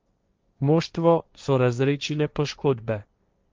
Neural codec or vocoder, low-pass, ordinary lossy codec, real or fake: codec, 16 kHz, 1.1 kbps, Voila-Tokenizer; 7.2 kHz; Opus, 24 kbps; fake